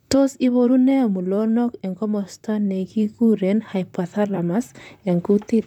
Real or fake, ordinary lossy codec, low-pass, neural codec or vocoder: fake; none; 19.8 kHz; vocoder, 44.1 kHz, 128 mel bands, Pupu-Vocoder